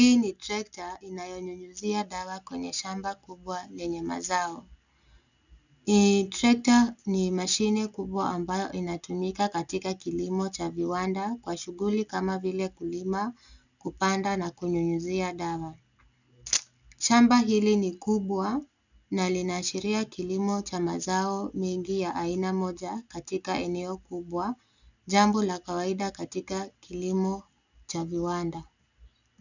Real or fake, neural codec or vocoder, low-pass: real; none; 7.2 kHz